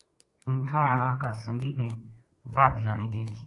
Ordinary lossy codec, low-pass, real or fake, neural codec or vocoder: AAC, 48 kbps; 10.8 kHz; fake; codec, 24 kHz, 1 kbps, SNAC